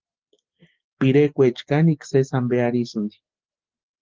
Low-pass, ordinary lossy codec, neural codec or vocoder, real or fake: 7.2 kHz; Opus, 16 kbps; none; real